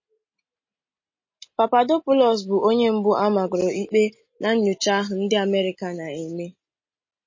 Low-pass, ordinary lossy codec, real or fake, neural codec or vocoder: 7.2 kHz; MP3, 32 kbps; real; none